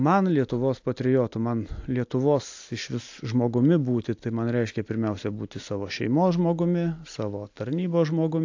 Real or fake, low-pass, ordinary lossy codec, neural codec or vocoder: real; 7.2 kHz; AAC, 48 kbps; none